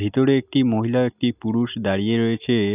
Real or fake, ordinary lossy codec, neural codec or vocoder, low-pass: real; none; none; 3.6 kHz